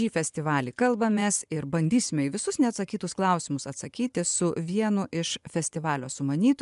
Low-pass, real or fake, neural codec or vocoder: 10.8 kHz; fake; vocoder, 24 kHz, 100 mel bands, Vocos